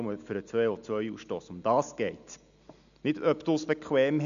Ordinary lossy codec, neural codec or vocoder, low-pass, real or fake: none; none; 7.2 kHz; real